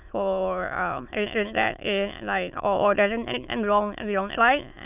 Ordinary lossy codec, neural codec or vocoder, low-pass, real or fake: none; autoencoder, 22.05 kHz, a latent of 192 numbers a frame, VITS, trained on many speakers; 3.6 kHz; fake